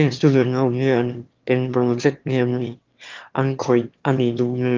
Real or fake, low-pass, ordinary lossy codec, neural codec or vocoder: fake; 7.2 kHz; Opus, 24 kbps; autoencoder, 22.05 kHz, a latent of 192 numbers a frame, VITS, trained on one speaker